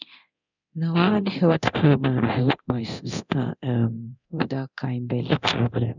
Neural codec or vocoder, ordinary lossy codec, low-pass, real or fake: codec, 24 kHz, 0.9 kbps, DualCodec; none; 7.2 kHz; fake